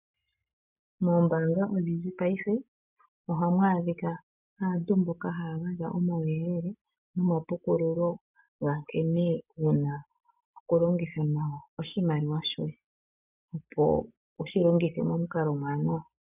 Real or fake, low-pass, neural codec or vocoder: real; 3.6 kHz; none